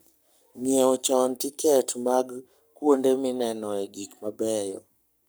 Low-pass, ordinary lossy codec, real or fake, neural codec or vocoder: none; none; fake; codec, 44.1 kHz, 7.8 kbps, Pupu-Codec